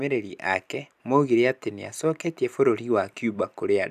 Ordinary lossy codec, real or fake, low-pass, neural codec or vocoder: none; fake; 14.4 kHz; vocoder, 44.1 kHz, 128 mel bands every 256 samples, BigVGAN v2